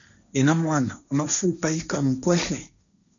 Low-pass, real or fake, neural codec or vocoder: 7.2 kHz; fake; codec, 16 kHz, 1.1 kbps, Voila-Tokenizer